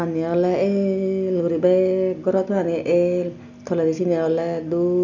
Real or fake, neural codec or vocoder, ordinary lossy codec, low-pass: real; none; none; 7.2 kHz